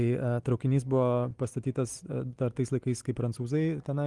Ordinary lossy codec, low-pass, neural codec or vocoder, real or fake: Opus, 24 kbps; 10.8 kHz; none; real